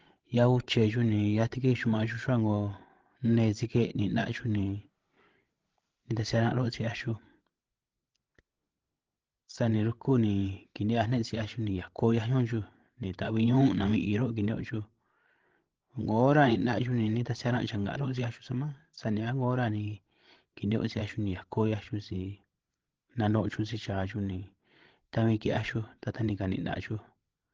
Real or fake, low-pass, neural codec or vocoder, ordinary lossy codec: fake; 7.2 kHz; codec, 16 kHz, 16 kbps, FreqCodec, larger model; Opus, 16 kbps